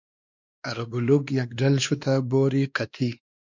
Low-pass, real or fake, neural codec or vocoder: 7.2 kHz; fake; codec, 16 kHz, 2 kbps, X-Codec, WavLM features, trained on Multilingual LibriSpeech